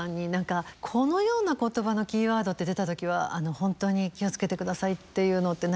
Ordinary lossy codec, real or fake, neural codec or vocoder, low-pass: none; real; none; none